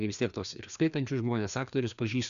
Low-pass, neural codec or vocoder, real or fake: 7.2 kHz; codec, 16 kHz, 2 kbps, FreqCodec, larger model; fake